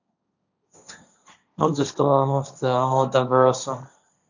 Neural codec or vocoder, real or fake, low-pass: codec, 16 kHz, 1.1 kbps, Voila-Tokenizer; fake; 7.2 kHz